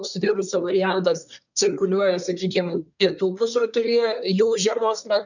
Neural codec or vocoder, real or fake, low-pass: codec, 24 kHz, 1 kbps, SNAC; fake; 7.2 kHz